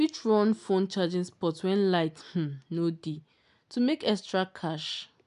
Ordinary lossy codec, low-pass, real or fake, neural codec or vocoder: MP3, 96 kbps; 10.8 kHz; real; none